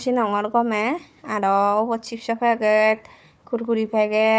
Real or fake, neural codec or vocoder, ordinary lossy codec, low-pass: fake; codec, 16 kHz, 4 kbps, FunCodec, trained on Chinese and English, 50 frames a second; none; none